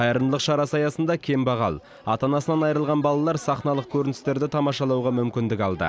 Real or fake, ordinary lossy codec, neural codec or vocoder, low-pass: real; none; none; none